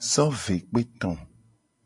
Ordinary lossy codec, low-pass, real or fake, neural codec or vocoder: MP3, 64 kbps; 10.8 kHz; real; none